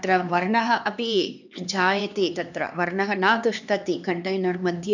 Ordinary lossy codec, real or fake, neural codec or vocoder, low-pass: none; fake; codec, 16 kHz, 2 kbps, X-Codec, HuBERT features, trained on LibriSpeech; 7.2 kHz